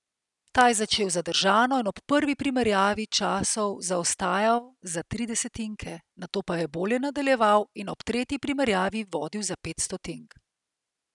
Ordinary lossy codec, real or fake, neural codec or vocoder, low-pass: none; real; none; 10.8 kHz